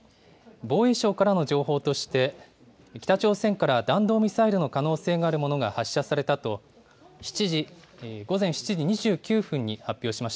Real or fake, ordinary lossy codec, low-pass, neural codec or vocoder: real; none; none; none